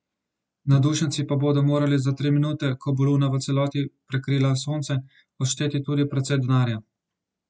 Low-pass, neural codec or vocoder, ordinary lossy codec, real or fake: none; none; none; real